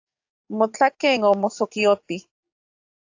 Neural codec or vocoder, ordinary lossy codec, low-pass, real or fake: codec, 44.1 kHz, 7.8 kbps, DAC; AAC, 48 kbps; 7.2 kHz; fake